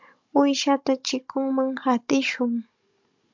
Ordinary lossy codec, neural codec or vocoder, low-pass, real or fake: MP3, 64 kbps; codec, 16 kHz, 16 kbps, FunCodec, trained on Chinese and English, 50 frames a second; 7.2 kHz; fake